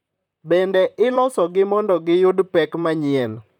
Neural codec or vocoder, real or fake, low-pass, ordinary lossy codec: vocoder, 44.1 kHz, 128 mel bands, Pupu-Vocoder; fake; 19.8 kHz; none